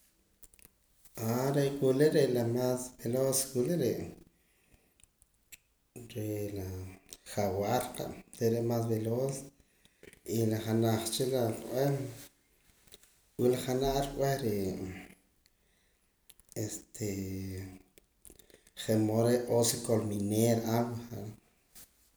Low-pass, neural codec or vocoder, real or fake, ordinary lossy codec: none; none; real; none